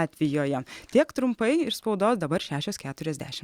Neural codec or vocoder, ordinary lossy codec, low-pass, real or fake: none; Opus, 64 kbps; 19.8 kHz; real